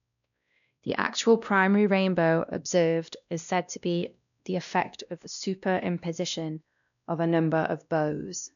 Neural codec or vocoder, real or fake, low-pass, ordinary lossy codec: codec, 16 kHz, 1 kbps, X-Codec, WavLM features, trained on Multilingual LibriSpeech; fake; 7.2 kHz; none